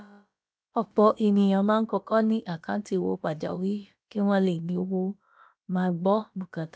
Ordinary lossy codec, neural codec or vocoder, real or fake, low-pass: none; codec, 16 kHz, about 1 kbps, DyCAST, with the encoder's durations; fake; none